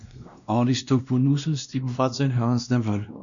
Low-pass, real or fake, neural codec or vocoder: 7.2 kHz; fake; codec, 16 kHz, 1 kbps, X-Codec, WavLM features, trained on Multilingual LibriSpeech